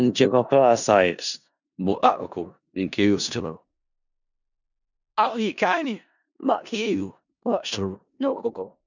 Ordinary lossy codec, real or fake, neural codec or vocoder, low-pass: none; fake; codec, 16 kHz in and 24 kHz out, 0.4 kbps, LongCat-Audio-Codec, four codebook decoder; 7.2 kHz